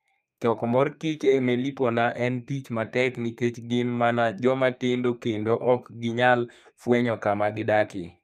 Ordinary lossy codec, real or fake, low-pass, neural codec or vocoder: none; fake; 14.4 kHz; codec, 32 kHz, 1.9 kbps, SNAC